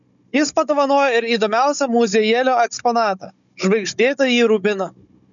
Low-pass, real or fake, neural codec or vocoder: 7.2 kHz; fake; codec, 16 kHz, 16 kbps, FunCodec, trained on Chinese and English, 50 frames a second